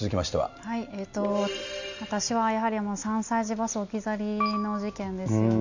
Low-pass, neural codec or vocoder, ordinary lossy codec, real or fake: 7.2 kHz; none; none; real